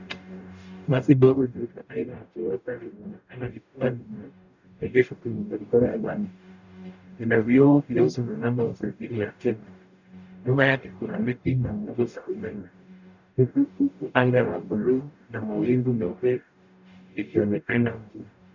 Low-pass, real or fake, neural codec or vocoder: 7.2 kHz; fake; codec, 44.1 kHz, 0.9 kbps, DAC